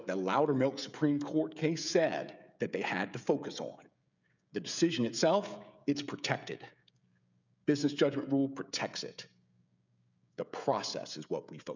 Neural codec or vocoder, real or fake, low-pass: codec, 16 kHz, 16 kbps, FreqCodec, smaller model; fake; 7.2 kHz